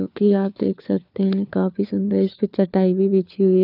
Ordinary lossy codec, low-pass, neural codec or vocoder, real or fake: none; 5.4 kHz; codec, 16 kHz, 4 kbps, FunCodec, trained on LibriTTS, 50 frames a second; fake